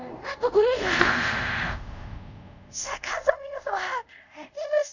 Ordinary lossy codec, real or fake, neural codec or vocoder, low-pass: none; fake; codec, 24 kHz, 0.5 kbps, DualCodec; 7.2 kHz